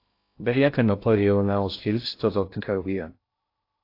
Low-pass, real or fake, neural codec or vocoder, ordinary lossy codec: 5.4 kHz; fake; codec, 16 kHz in and 24 kHz out, 0.6 kbps, FocalCodec, streaming, 2048 codes; AAC, 32 kbps